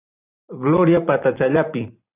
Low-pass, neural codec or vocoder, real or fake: 3.6 kHz; vocoder, 44.1 kHz, 128 mel bands every 512 samples, BigVGAN v2; fake